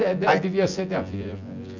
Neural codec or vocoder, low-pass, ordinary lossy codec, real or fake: vocoder, 24 kHz, 100 mel bands, Vocos; 7.2 kHz; none; fake